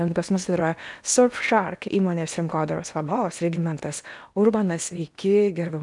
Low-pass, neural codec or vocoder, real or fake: 10.8 kHz; codec, 16 kHz in and 24 kHz out, 0.8 kbps, FocalCodec, streaming, 65536 codes; fake